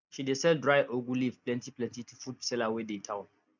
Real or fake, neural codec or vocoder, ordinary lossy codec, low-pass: real; none; none; 7.2 kHz